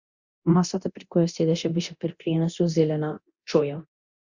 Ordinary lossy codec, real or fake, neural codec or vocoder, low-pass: Opus, 64 kbps; fake; codec, 24 kHz, 0.9 kbps, DualCodec; 7.2 kHz